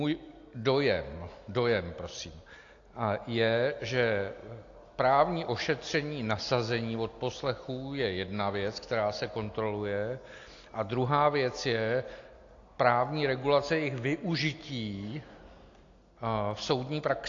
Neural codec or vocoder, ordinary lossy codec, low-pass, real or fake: none; AAC, 48 kbps; 7.2 kHz; real